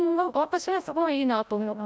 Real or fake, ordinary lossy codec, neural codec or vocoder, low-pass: fake; none; codec, 16 kHz, 0.5 kbps, FreqCodec, larger model; none